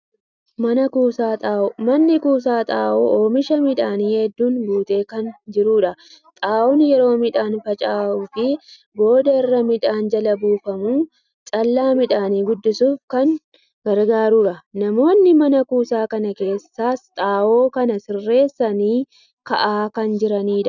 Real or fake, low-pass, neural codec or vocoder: real; 7.2 kHz; none